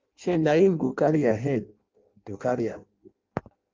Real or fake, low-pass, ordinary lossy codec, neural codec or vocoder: fake; 7.2 kHz; Opus, 24 kbps; codec, 16 kHz in and 24 kHz out, 0.6 kbps, FireRedTTS-2 codec